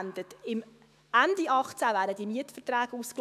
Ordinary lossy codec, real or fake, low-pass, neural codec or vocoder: AAC, 96 kbps; fake; 14.4 kHz; autoencoder, 48 kHz, 128 numbers a frame, DAC-VAE, trained on Japanese speech